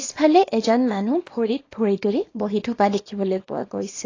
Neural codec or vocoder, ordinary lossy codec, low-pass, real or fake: codec, 24 kHz, 0.9 kbps, WavTokenizer, small release; AAC, 32 kbps; 7.2 kHz; fake